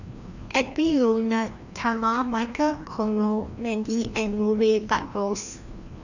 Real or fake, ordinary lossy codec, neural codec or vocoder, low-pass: fake; none; codec, 16 kHz, 1 kbps, FreqCodec, larger model; 7.2 kHz